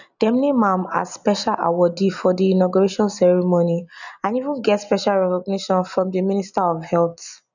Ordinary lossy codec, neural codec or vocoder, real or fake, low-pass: none; none; real; 7.2 kHz